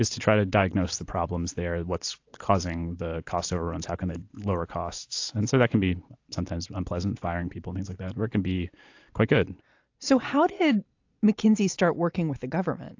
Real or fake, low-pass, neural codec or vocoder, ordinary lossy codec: real; 7.2 kHz; none; AAC, 48 kbps